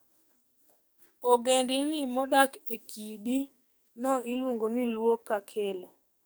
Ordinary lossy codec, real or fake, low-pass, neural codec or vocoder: none; fake; none; codec, 44.1 kHz, 2.6 kbps, SNAC